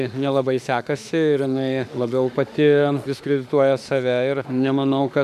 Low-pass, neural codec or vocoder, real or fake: 14.4 kHz; autoencoder, 48 kHz, 32 numbers a frame, DAC-VAE, trained on Japanese speech; fake